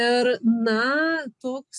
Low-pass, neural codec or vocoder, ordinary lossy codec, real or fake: 10.8 kHz; none; MP3, 64 kbps; real